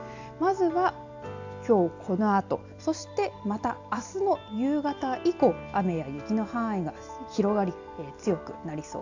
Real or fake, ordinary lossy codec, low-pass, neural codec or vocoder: real; AAC, 48 kbps; 7.2 kHz; none